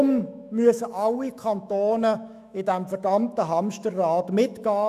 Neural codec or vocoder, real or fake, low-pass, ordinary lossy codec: autoencoder, 48 kHz, 128 numbers a frame, DAC-VAE, trained on Japanese speech; fake; 14.4 kHz; none